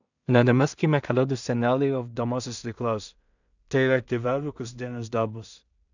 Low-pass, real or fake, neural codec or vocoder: 7.2 kHz; fake; codec, 16 kHz in and 24 kHz out, 0.4 kbps, LongCat-Audio-Codec, two codebook decoder